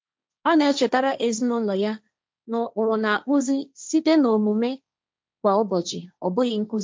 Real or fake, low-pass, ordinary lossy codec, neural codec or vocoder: fake; none; none; codec, 16 kHz, 1.1 kbps, Voila-Tokenizer